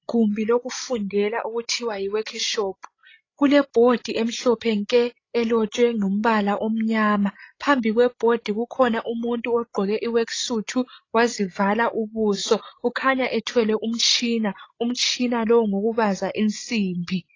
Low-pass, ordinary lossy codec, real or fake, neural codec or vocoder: 7.2 kHz; AAC, 32 kbps; fake; codec, 16 kHz, 16 kbps, FreqCodec, larger model